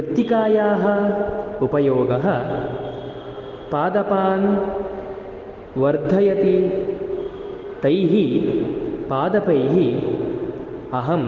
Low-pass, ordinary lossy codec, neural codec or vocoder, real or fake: 7.2 kHz; Opus, 16 kbps; none; real